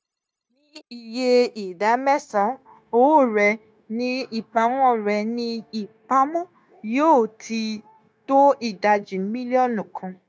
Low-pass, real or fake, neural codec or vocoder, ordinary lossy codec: none; fake; codec, 16 kHz, 0.9 kbps, LongCat-Audio-Codec; none